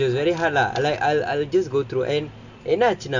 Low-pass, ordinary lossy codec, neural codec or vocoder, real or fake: 7.2 kHz; none; none; real